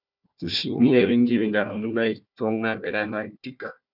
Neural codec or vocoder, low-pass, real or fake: codec, 16 kHz, 1 kbps, FunCodec, trained on Chinese and English, 50 frames a second; 5.4 kHz; fake